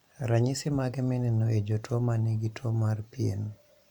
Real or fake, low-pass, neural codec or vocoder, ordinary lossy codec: fake; 19.8 kHz; vocoder, 44.1 kHz, 128 mel bands every 512 samples, BigVGAN v2; MP3, 96 kbps